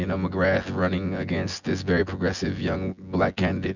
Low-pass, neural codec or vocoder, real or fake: 7.2 kHz; vocoder, 24 kHz, 100 mel bands, Vocos; fake